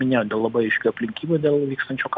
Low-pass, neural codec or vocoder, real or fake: 7.2 kHz; none; real